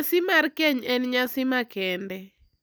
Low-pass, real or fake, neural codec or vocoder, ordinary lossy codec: none; real; none; none